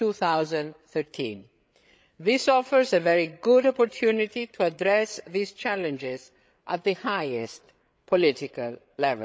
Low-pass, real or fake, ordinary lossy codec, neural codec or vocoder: none; fake; none; codec, 16 kHz, 8 kbps, FreqCodec, larger model